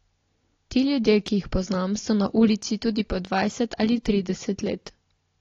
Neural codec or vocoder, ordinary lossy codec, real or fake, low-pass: none; AAC, 32 kbps; real; 7.2 kHz